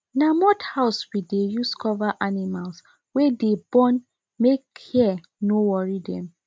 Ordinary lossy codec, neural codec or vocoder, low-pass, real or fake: none; none; none; real